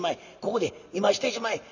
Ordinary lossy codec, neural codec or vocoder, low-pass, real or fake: none; none; 7.2 kHz; real